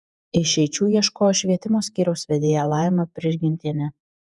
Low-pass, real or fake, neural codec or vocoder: 10.8 kHz; fake; vocoder, 48 kHz, 128 mel bands, Vocos